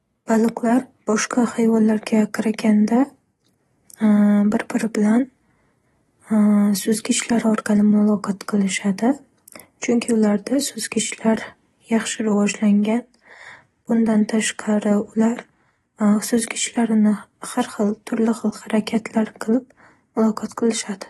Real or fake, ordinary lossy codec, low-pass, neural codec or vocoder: real; AAC, 32 kbps; 19.8 kHz; none